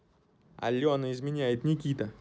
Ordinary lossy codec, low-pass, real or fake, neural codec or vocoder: none; none; real; none